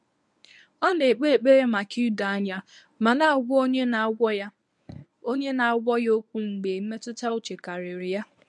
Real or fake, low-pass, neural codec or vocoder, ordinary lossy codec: fake; 10.8 kHz; codec, 24 kHz, 0.9 kbps, WavTokenizer, medium speech release version 1; none